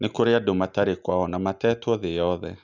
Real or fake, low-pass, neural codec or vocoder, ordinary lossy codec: real; 7.2 kHz; none; none